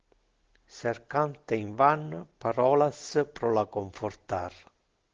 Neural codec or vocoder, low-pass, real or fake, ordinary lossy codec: none; 7.2 kHz; real; Opus, 16 kbps